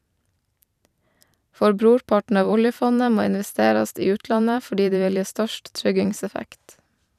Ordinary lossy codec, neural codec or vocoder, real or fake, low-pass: none; vocoder, 44.1 kHz, 128 mel bands every 512 samples, BigVGAN v2; fake; 14.4 kHz